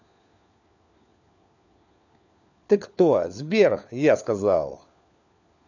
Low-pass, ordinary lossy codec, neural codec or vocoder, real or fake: 7.2 kHz; none; codec, 16 kHz, 4 kbps, FunCodec, trained on LibriTTS, 50 frames a second; fake